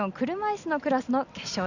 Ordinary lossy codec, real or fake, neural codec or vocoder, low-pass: none; real; none; 7.2 kHz